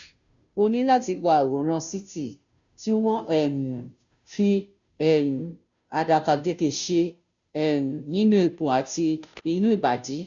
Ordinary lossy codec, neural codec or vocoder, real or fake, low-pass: none; codec, 16 kHz, 0.5 kbps, FunCodec, trained on Chinese and English, 25 frames a second; fake; 7.2 kHz